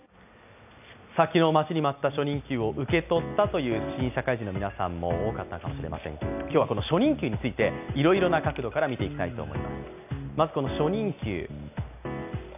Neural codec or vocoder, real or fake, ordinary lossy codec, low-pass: none; real; none; 3.6 kHz